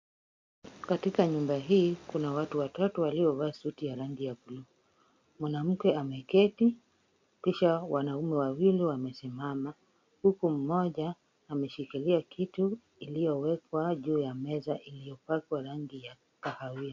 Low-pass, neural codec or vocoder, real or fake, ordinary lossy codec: 7.2 kHz; none; real; MP3, 48 kbps